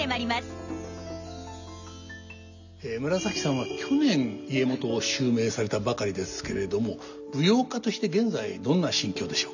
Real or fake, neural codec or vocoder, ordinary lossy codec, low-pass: real; none; none; 7.2 kHz